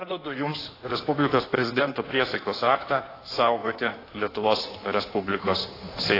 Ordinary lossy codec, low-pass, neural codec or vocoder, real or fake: AAC, 24 kbps; 5.4 kHz; codec, 16 kHz in and 24 kHz out, 1.1 kbps, FireRedTTS-2 codec; fake